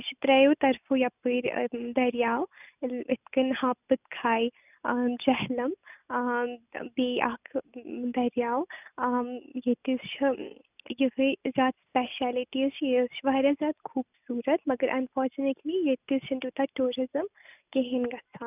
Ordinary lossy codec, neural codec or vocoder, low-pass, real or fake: none; none; 3.6 kHz; real